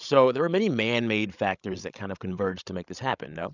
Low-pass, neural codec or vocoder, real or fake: 7.2 kHz; codec, 16 kHz, 16 kbps, FreqCodec, larger model; fake